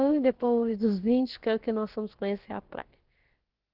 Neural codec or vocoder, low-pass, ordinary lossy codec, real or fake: codec, 16 kHz, about 1 kbps, DyCAST, with the encoder's durations; 5.4 kHz; Opus, 16 kbps; fake